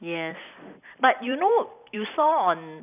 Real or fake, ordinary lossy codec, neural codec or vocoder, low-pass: fake; none; vocoder, 44.1 kHz, 128 mel bands every 256 samples, BigVGAN v2; 3.6 kHz